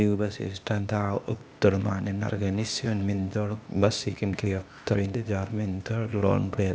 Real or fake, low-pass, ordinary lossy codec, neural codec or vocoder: fake; none; none; codec, 16 kHz, 0.8 kbps, ZipCodec